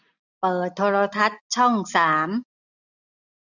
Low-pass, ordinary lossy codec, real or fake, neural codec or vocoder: 7.2 kHz; none; real; none